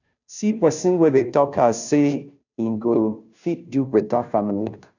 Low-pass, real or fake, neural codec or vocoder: 7.2 kHz; fake; codec, 16 kHz, 0.5 kbps, FunCodec, trained on Chinese and English, 25 frames a second